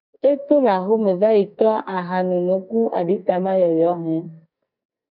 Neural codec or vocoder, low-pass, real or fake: codec, 44.1 kHz, 2.6 kbps, SNAC; 5.4 kHz; fake